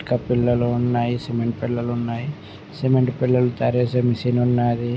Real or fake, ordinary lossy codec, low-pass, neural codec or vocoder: real; none; none; none